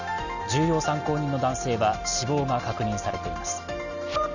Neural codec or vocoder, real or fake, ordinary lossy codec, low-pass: none; real; none; 7.2 kHz